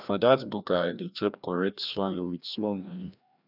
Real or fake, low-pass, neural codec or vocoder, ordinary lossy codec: fake; 5.4 kHz; codec, 16 kHz, 1 kbps, FreqCodec, larger model; none